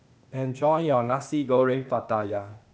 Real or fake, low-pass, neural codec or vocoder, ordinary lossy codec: fake; none; codec, 16 kHz, 0.8 kbps, ZipCodec; none